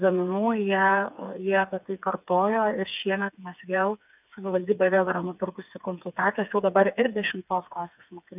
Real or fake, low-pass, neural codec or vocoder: fake; 3.6 kHz; codec, 16 kHz, 4 kbps, FreqCodec, smaller model